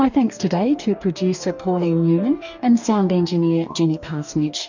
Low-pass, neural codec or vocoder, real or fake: 7.2 kHz; codec, 44.1 kHz, 2.6 kbps, DAC; fake